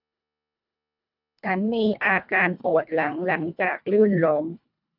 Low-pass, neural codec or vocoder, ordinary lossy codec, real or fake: 5.4 kHz; codec, 24 kHz, 1.5 kbps, HILCodec; none; fake